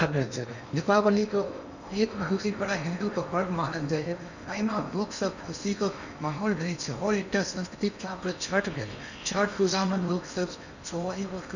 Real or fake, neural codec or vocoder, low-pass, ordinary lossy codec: fake; codec, 16 kHz in and 24 kHz out, 0.8 kbps, FocalCodec, streaming, 65536 codes; 7.2 kHz; none